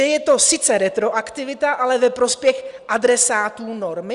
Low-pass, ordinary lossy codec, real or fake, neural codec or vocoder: 10.8 kHz; Opus, 64 kbps; real; none